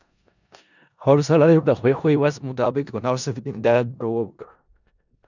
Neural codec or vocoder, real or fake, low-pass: codec, 16 kHz in and 24 kHz out, 0.4 kbps, LongCat-Audio-Codec, four codebook decoder; fake; 7.2 kHz